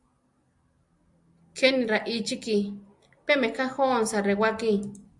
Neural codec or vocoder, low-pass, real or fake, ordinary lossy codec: none; 10.8 kHz; real; AAC, 64 kbps